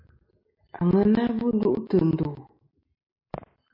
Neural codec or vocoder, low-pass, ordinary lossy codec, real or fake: none; 5.4 kHz; MP3, 32 kbps; real